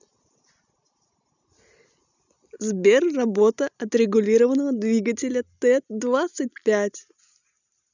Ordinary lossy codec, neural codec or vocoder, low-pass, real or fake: none; none; 7.2 kHz; real